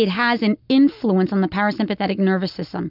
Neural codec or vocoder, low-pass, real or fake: none; 5.4 kHz; real